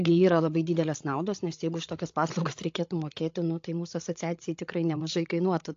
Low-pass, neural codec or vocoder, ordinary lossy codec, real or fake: 7.2 kHz; codec, 16 kHz, 16 kbps, FreqCodec, larger model; AAC, 48 kbps; fake